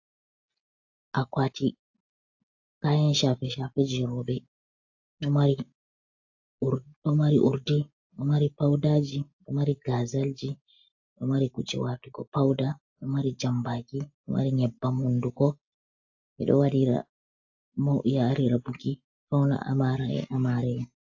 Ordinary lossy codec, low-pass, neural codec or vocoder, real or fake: AAC, 48 kbps; 7.2 kHz; none; real